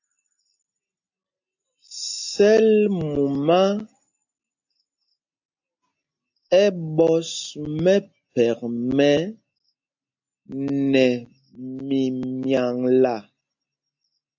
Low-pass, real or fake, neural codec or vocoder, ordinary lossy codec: 7.2 kHz; real; none; AAC, 48 kbps